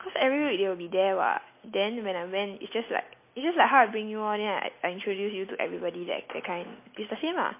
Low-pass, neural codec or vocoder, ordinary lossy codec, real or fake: 3.6 kHz; none; MP3, 24 kbps; real